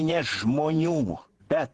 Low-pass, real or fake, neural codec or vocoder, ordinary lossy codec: 10.8 kHz; fake; vocoder, 48 kHz, 128 mel bands, Vocos; Opus, 16 kbps